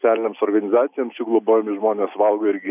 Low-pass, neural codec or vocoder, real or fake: 3.6 kHz; none; real